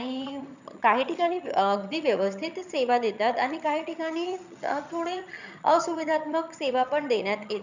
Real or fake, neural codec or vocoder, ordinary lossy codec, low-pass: fake; vocoder, 22.05 kHz, 80 mel bands, HiFi-GAN; none; 7.2 kHz